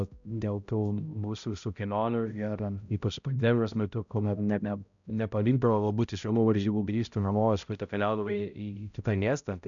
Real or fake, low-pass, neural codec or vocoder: fake; 7.2 kHz; codec, 16 kHz, 0.5 kbps, X-Codec, HuBERT features, trained on balanced general audio